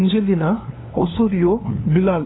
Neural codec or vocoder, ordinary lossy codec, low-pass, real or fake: codec, 16 kHz, 2 kbps, FunCodec, trained on LibriTTS, 25 frames a second; AAC, 16 kbps; 7.2 kHz; fake